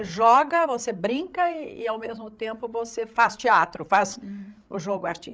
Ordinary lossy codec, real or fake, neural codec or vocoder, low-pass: none; fake; codec, 16 kHz, 8 kbps, FreqCodec, larger model; none